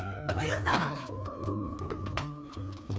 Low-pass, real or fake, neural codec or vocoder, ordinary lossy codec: none; fake; codec, 16 kHz, 2 kbps, FreqCodec, larger model; none